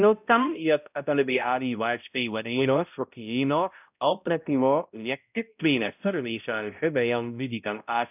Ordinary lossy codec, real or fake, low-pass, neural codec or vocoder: none; fake; 3.6 kHz; codec, 16 kHz, 0.5 kbps, X-Codec, HuBERT features, trained on balanced general audio